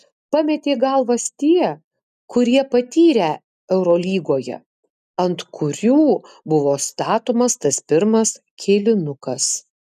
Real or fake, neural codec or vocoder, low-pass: real; none; 14.4 kHz